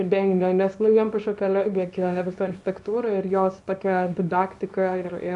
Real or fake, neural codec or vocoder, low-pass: fake; codec, 24 kHz, 0.9 kbps, WavTokenizer, small release; 10.8 kHz